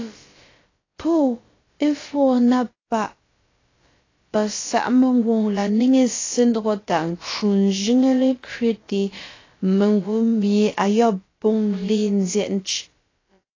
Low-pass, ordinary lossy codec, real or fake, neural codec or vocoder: 7.2 kHz; AAC, 32 kbps; fake; codec, 16 kHz, about 1 kbps, DyCAST, with the encoder's durations